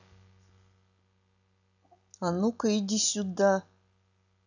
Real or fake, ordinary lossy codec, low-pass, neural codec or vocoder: real; none; 7.2 kHz; none